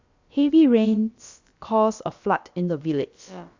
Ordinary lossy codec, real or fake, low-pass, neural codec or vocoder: Opus, 64 kbps; fake; 7.2 kHz; codec, 16 kHz, about 1 kbps, DyCAST, with the encoder's durations